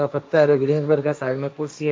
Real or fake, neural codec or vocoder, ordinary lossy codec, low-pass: fake; codec, 16 kHz, 1.1 kbps, Voila-Tokenizer; none; none